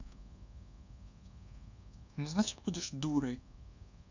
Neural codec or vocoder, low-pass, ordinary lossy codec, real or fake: codec, 24 kHz, 1.2 kbps, DualCodec; 7.2 kHz; none; fake